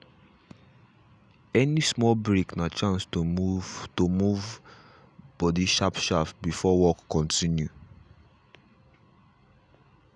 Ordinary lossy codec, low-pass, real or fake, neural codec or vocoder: none; 9.9 kHz; real; none